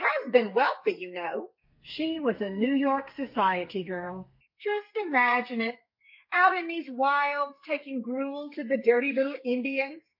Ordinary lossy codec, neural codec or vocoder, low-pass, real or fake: MP3, 32 kbps; codec, 32 kHz, 1.9 kbps, SNAC; 5.4 kHz; fake